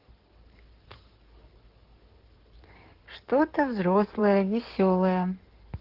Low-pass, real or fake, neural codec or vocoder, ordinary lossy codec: 5.4 kHz; fake; codec, 16 kHz in and 24 kHz out, 2.2 kbps, FireRedTTS-2 codec; Opus, 16 kbps